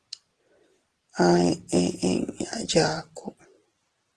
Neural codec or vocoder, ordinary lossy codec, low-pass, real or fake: none; Opus, 16 kbps; 10.8 kHz; real